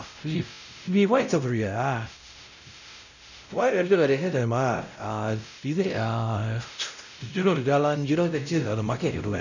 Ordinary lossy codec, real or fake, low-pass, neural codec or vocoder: none; fake; 7.2 kHz; codec, 16 kHz, 0.5 kbps, X-Codec, WavLM features, trained on Multilingual LibriSpeech